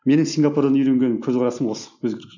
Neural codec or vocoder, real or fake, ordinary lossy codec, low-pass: none; real; none; 7.2 kHz